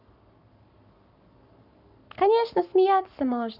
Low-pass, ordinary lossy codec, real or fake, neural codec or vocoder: 5.4 kHz; none; fake; codec, 44.1 kHz, 7.8 kbps, Pupu-Codec